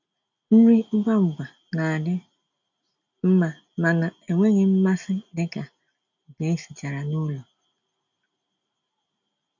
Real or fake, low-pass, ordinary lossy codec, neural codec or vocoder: real; 7.2 kHz; none; none